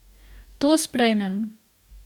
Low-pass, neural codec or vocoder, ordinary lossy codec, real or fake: 19.8 kHz; codec, 44.1 kHz, 2.6 kbps, DAC; none; fake